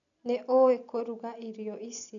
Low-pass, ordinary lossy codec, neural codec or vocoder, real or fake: 7.2 kHz; none; none; real